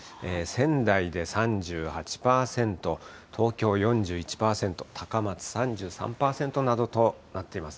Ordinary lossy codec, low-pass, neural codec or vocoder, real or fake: none; none; none; real